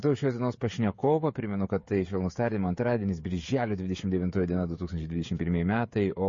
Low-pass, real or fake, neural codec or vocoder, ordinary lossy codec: 7.2 kHz; fake; codec, 16 kHz, 16 kbps, FreqCodec, smaller model; MP3, 32 kbps